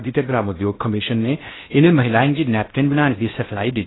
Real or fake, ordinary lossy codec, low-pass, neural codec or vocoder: fake; AAC, 16 kbps; 7.2 kHz; codec, 16 kHz in and 24 kHz out, 0.6 kbps, FocalCodec, streaming, 2048 codes